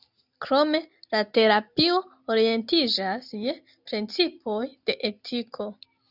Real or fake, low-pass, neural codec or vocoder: real; 5.4 kHz; none